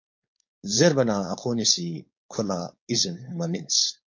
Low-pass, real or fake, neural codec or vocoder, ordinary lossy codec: 7.2 kHz; fake; codec, 16 kHz, 4.8 kbps, FACodec; MP3, 48 kbps